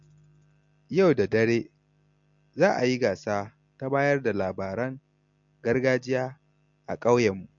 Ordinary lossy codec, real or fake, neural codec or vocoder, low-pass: MP3, 48 kbps; real; none; 7.2 kHz